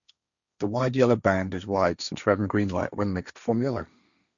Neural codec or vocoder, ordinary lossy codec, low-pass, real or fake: codec, 16 kHz, 1.1 kbps, Voila-Tokenizer; none; 7.2 kHz; fake